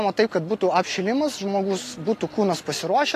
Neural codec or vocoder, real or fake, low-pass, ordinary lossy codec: none; real; 14.4 kHz; AAC, 48 kbps